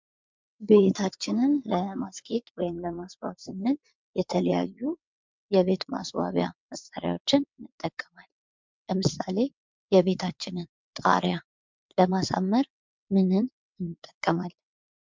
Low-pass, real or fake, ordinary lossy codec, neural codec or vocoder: 7.2 kHz; fake; MP3, 64 kbps; vocoder, 22.05 kHz, 80 mel bands, WaveNeXt